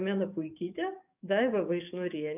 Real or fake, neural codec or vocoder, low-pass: fake; codec, 44.1 kHz, 7.8 kbps, DAC; 3.6 kHz